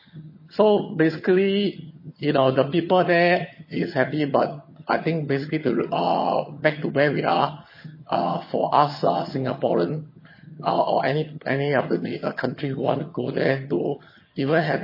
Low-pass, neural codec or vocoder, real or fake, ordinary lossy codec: 5.4 kHz; vocoder, 22.05 kHz, 80 mel bands, HiFi-GAN; fake; MP3, 24 kbps